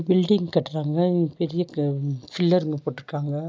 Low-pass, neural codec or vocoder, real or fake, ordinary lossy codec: none; none; real; none